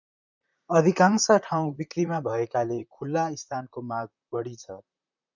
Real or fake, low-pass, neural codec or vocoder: fake; 7.2 kHz; vocoder, 44.1 kHz, 128 mel bands, Pupu-Vocoder